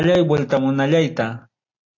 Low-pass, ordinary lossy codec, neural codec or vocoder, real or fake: 7.2 kHz; AAC, 48 kbps; none; real